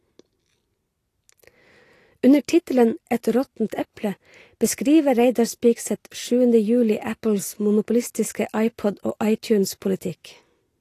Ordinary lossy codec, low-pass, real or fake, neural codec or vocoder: AAC, 48 kbps; 14.4 kHz; real; none